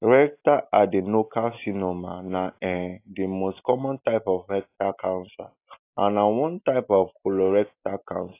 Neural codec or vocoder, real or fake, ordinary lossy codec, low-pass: none; real; AAC, 24 kbps; 3.6 kHz